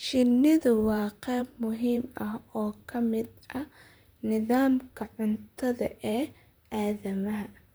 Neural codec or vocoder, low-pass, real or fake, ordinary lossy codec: vocoder, 44.1 kHz, 128 mel bands, Pupu-Vocoder; none; fake; none